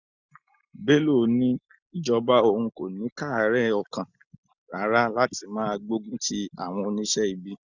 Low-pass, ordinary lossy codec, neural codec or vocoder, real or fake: 7.2 kHz; none; none; real